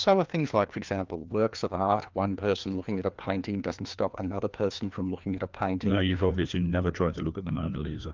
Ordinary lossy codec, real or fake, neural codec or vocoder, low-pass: Opus, 24 kbps; fake; codec, 16 kHz, 2 kbps, FreqCodec, larger model; 7.2 kHz